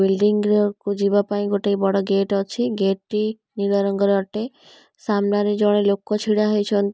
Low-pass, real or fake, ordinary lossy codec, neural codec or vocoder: none; real; none; none